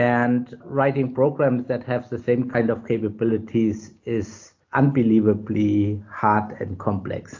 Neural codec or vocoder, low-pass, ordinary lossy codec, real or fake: none; 7.2 kHz; AAC, 48 kbps; real